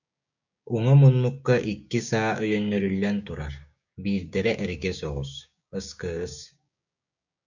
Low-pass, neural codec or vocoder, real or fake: 7.2 kHz; codec, 16 kHz, 6 kbps, DAC; fake